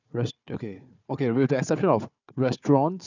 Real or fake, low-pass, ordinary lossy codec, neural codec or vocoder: fake; 7.2 kHz; none; codec, 16 kHz, 4 kbps, FunCodec, trained on Chinese and English, 50 frames a second